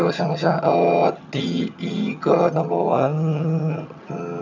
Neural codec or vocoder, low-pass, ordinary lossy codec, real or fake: vocoder, 22.05 kHz, 80 mel bands, HiFi-GAN; 7.2 kHz; none; fake